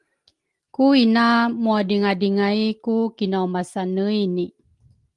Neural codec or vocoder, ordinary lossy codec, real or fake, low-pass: none; Opus, 24 kbps; real; 10.8 kHz